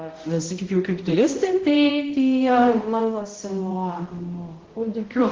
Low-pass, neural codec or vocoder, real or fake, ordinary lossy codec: 7.2 kHz; codec, 16 kHz, 0.5 kbps, X-Codec, HuBERT features, trained on balanced general audio; fake; Opus, 16 kbps